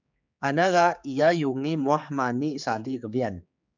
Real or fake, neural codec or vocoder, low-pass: fake; codec, 16 kHz, 4 kbps, X-Codec, HuBERT features, trained on general audio; 7.2 kHz